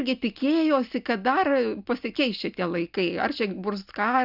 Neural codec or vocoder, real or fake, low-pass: none; real; 5.4 kHz